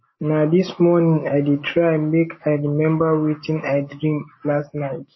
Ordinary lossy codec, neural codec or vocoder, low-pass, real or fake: MP3, 24 kbps; none; 7.2 kHz; real